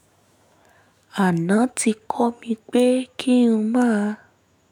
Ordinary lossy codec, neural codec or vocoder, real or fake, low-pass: MP3, 96 kbps; autoencoder, 48 kHz, 128 numbers a frame, DAC-VAE, trained on Japanese speech; fake; 19.8 kHz